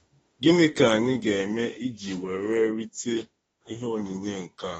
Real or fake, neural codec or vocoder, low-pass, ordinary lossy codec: fake; autoencoder, 48 kHz, 32 numbers a frame, DAC-VAE, trained on Japanese speech; 19.8 kHz; AAC, 24 kbps